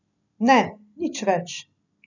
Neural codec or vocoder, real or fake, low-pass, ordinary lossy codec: none; real; 7.2 kHz; none